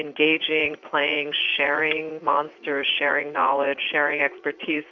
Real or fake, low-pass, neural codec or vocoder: fake; 7.2 kHz; vocoder, 44.1 kHz, 80 mel bands, Vocos